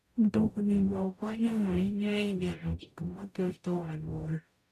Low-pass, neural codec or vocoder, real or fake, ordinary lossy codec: 14.4 kHz; codec, 44.1 kHz, 0.9 kbps, DAC; fake; none